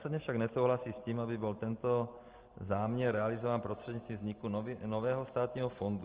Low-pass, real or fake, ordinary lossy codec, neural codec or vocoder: 3.6 kHz; real; Opus, 16 kbps; none